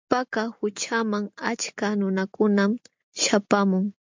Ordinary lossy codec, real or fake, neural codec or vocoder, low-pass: MP3, 64 kbps; real; none; 7.2 kHz